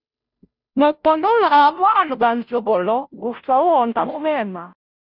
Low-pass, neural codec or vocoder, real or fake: 5.4 kHz; codec, 16 kHz, 0.5 kbps, FunCodec, trained on Chinese and English, 25 frames a second; fake